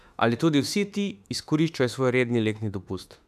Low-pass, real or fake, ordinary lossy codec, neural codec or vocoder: 14.4 kHz; fake; none; autoencoder, 48 kHz, 32 numbers a frame, DAC-VAE, trained on Japanese speech